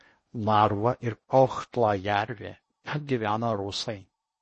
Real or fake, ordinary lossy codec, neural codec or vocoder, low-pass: fake; MP3, 32 kbps; codec, 16 kHz in and 24 kHz out, 0.8 kbps, FocalCodec, streaming, 65536 codes; 10.8 kHz